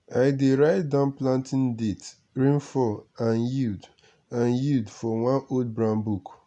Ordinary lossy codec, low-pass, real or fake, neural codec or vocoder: none; 10.8 kHz; real; none